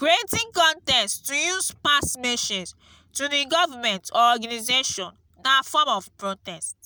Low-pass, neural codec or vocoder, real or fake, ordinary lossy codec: none; none; real; none